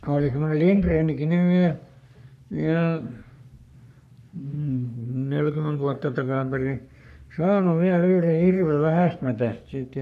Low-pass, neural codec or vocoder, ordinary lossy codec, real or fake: 14.4 kHz; codec, 44.1 kHz, 3.4 kbps, Pupu-Codec; none; fake